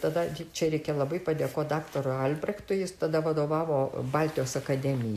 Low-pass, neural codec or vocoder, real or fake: 14.4 kHz; none; real